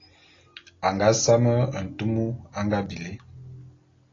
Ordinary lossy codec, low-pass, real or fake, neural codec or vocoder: AAC, 32 kbps; 7.2 kHz; real; none